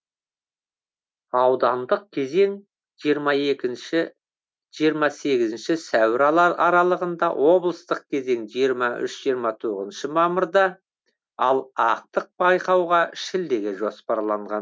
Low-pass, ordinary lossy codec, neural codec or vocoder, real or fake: none; none; none; real